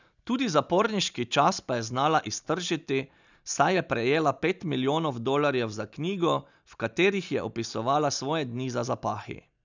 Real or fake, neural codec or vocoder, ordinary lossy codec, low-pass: real; none; none; 7.2 kHz